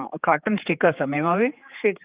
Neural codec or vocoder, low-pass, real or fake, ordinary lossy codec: codec, 16 kHz, 4 kbps, X-Codec, HuBERT features, trained on general audio; 3.6 kHz; fake; Opus, 32 kbps